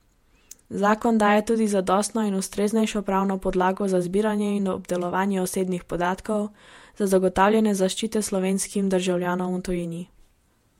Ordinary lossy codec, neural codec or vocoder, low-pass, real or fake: MP3, 64 kbps; vocoder, 48 kHz, 128 mel bands, Vocos; 19.8 kHz; fake